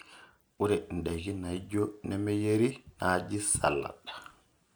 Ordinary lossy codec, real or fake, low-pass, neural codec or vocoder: none; real; none; none